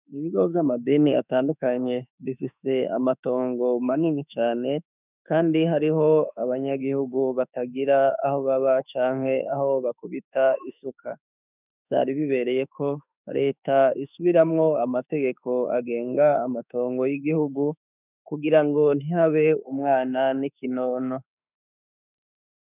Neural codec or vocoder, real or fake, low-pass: autoencoder, 48 kHz, 32 numbers a frame, DAC-VAE, trained on Japanese speech; fake; 3.6 kHz